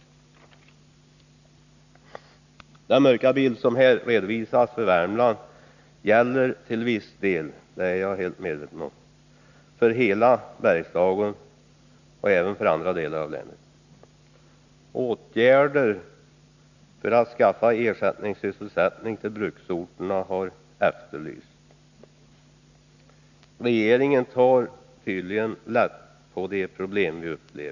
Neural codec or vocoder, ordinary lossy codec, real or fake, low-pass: none; none; real; 7.2 kHz